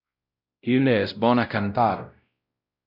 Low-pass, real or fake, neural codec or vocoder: 5.4 kHz; fake; codec, 16 kHz, 0.5 kbps, X-Codec, WavLM features, trained on Multilingual LibriSpeech